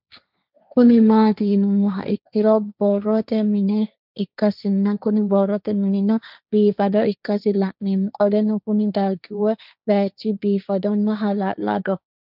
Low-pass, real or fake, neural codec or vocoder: 5.4 kHz; fake; codec, 16 kHz, 1.1 kbps, Voila-Tokenizer